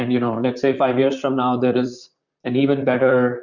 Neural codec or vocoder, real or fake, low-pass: vocoder, 44.1 kHz, 128 mel bands, Pupu-Vocoder; fake; 7.2 kHz